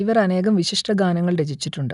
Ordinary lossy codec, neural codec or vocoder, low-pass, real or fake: none; none; 10.8 kHz; real